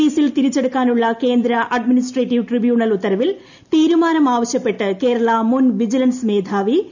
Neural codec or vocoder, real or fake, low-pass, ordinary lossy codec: none; real; 7.2 kHz; none